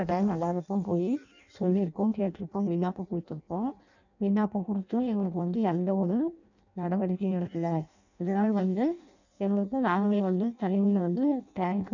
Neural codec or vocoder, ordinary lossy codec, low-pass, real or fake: codec, 16 kHz in and 24 kHz out, 0.6 kbps, FireRedTTS-2 codec; none; 7.2 kHz; fake